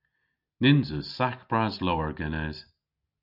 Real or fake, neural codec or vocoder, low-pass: real; none; 5.4 kHz